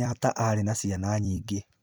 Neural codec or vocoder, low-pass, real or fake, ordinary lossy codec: vocoder, 44.1 kHz, 128 mel bands every 256 samples, BigVGAN v2; none; fake; none